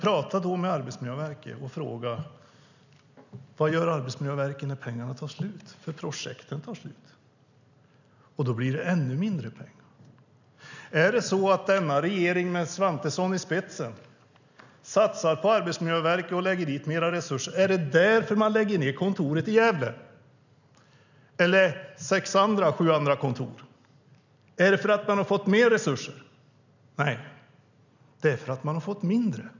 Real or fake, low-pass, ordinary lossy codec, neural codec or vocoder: real; 7.2 kHz; none; none